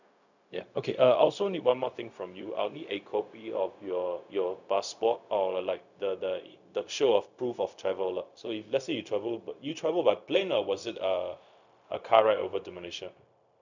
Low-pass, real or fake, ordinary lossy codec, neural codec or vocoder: 7.2 kHz; fake; none; codec, 16 kHz, 0.4 kbps, LongCat-Audio-Codec